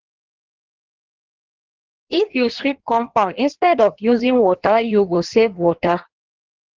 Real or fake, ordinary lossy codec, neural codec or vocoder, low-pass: fake; Opus, 16 kbps; codec, 16 kHz in and 24 kHz out, 1.1 kbps, FireRedTTS-2 codec; 7.2 kHz